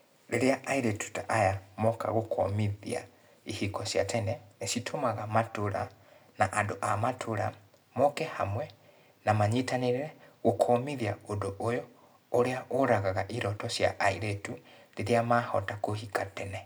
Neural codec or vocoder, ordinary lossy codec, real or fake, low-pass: none; none; real; none